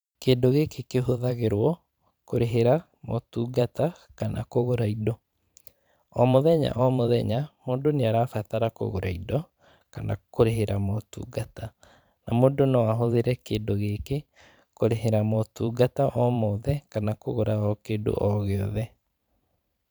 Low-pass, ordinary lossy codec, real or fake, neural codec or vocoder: none; none; fake; vocoder, 44.1 kHz, 128 mel bands every 512 samples, BigVGAN v2